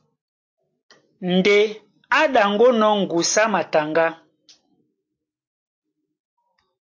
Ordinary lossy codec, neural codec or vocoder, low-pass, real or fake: AAC, 48 kbps; none; 7.2 kHz; real